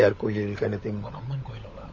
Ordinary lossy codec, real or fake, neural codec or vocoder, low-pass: MP3, 32 kbps; fake; codec, 16 kHz, 16 kbps, FunCodec, trained on LibriTTS, 50 frames a second; 7.2 kHz